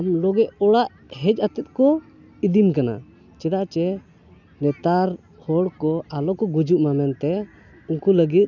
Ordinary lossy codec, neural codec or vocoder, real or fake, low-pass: none; none; real; 7.2 kHz